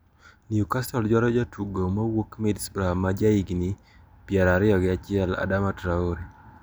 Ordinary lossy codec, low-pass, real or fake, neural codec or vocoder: none; none; real; none